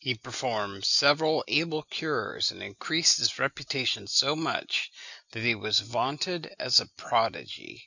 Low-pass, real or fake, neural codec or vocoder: 7.2 kHz; real; none